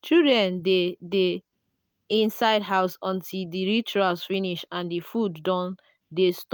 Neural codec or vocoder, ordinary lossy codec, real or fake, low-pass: none; none; real; none